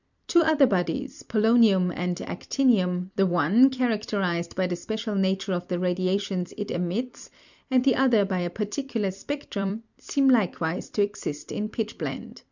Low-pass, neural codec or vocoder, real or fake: 7.2 kHz; vocoder, 44.1 kHz, 128 mel bands every 256 samples, BigVGAN v2; fake